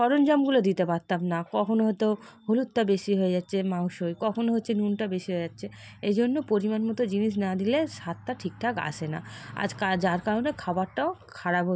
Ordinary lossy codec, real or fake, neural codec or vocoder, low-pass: none; real; none; none